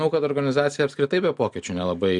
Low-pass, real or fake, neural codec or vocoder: 10.8 kHz; real; none